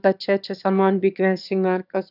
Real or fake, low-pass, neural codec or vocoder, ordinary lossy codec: fake; 5.4 kHz; autoencoder, 22.05 kHz, a latent of 192 numbers a frame, VITS, trained on one speaker; none